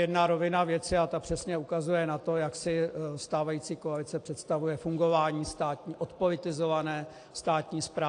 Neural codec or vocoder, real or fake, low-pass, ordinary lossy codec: none; real; 9.9 kHz; AAC, 48 kbps